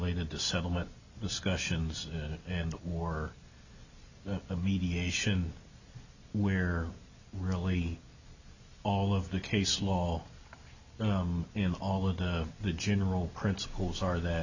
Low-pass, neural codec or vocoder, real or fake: 7.2 kHz; none; real